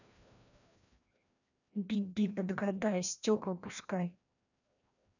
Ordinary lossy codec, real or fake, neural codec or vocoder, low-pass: none; fake; codec, 16 kHz, 1 kbps, FreqCodec, larger model; 7.2 kHz